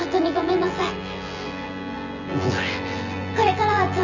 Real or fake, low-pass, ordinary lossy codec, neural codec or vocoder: fake; 7.2 kHz; none; vocoder, 24 kHz, 100 mel bands, Vocos